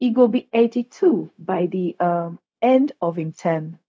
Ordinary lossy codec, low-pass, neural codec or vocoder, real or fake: none; none; codec, 16 kHz, 0.4 kbps, LongCat-Audio-Codec; fake